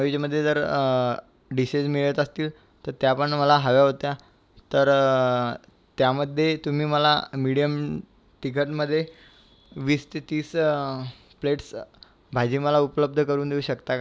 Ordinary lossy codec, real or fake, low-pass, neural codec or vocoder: none; real; none; none